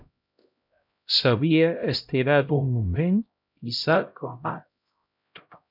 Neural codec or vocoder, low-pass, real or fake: codec, 16 kHz, 0.5 kbps, X-Codec, HuBERT features, trained on LibriSpeech; 5.4 kHz; fake